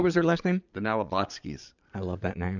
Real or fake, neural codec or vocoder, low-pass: fake; vocoder, 22.05 kHz, 80 mel bands, Vocos; 7.2 kHz